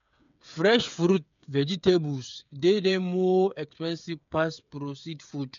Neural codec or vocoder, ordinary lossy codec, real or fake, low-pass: codec, 16 kHz, 8 kbps, FreqCodec, smaller model; MP3, 64 kbps; fake; 7.2 kHz